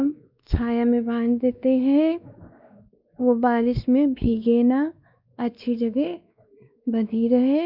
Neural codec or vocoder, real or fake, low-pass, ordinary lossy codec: codec, 16 kHz, 2 kbps, X-Codec, WavLM features, trained on Multilingual LibriSpeech; fake; 5.4 kHz; none